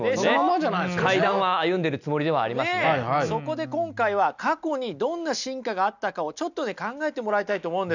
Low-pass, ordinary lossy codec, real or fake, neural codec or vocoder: 7.2 kHz; none; real; none